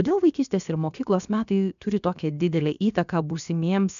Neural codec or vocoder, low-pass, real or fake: codec, 16 kHz, about 1 kbps, DyCAST, with the encoder's durations; 7.2 kHz; fake